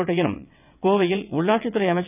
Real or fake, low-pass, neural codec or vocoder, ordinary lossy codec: fake; 3.6 kHz; vocoder, 22.05 kHz, 80 mel bands, WaveNeXt; none